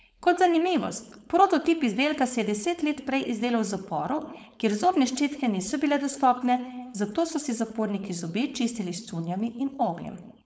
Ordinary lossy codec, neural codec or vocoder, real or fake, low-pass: none; codec, 16 kHz, 4.8 kbps, FACodec; fake; none